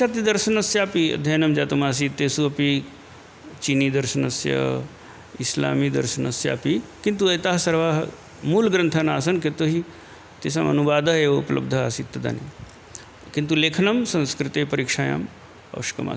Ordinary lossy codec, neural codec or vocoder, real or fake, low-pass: none; none; real; none